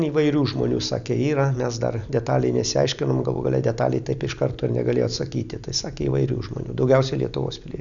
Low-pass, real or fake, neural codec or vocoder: 7.2 kHz; real; none